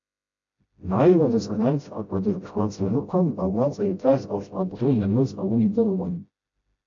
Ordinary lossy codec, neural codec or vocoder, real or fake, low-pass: AAC, 48 kbps; codec, 16 kHz, 0.5 kbps, FreqCodec, smaller model; fake; 7.2 kHz